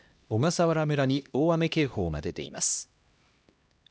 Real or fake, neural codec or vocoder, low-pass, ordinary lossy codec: fake; codec, 16 kHz, 1 kbps, X-Codec, HuBERT features, trained on LibriSpeech; none; none